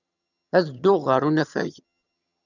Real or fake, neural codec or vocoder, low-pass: fake; vocoder, 22.05 kHz, 80 mel bands, HiFi-GAN; 7.2 kHz